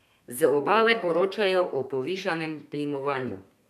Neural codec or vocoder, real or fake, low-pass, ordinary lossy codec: codec, 32 kHz, 1.9 kbps, SNAC; fake; 14.4 kHz; none